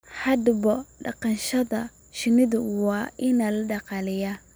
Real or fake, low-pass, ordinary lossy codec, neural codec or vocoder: real; none; none; none